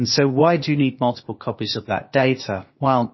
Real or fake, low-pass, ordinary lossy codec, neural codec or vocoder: fake; 7.2 kHz; MP3, 24 kbps; codec, 16 kHz, 0.8 kbps, ZipCodec